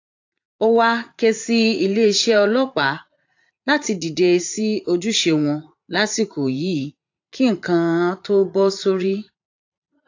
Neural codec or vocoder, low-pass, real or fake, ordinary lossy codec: vocoder, 44.1 kHz, 80 mel bands, Vocos; 7.2 kHz; fake; AAC, 48 kbps